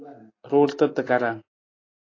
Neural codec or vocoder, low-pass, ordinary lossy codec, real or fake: none; 7.2 kHz; AAC, 32 kbps; real